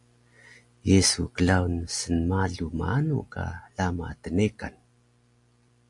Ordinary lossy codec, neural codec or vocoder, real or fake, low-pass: AAC, 64 kbps; none; real; 10.8 kHz